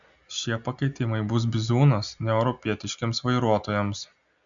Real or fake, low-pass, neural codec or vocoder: real; 7.2 kHz; none